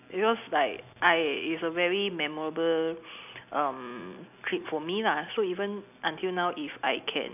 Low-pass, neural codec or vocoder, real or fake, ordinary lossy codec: 3.6 kHz; none; real; none